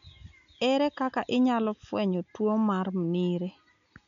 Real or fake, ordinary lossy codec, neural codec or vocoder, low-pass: real; none; none; 7.2 kHz